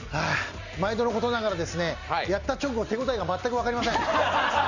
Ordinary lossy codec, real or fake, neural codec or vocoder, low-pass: Opus, 64 kbps; real; none; 7.2 kHz